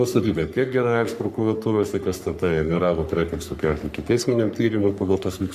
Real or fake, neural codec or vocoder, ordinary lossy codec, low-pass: fake; codec, 44.1 kHz, 3.4 kbps, Pupu-Codec; MP3, 96 kbps; 14.4 kHz